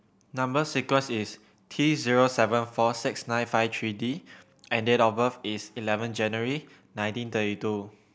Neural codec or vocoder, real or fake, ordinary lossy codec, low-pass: none; real; none; none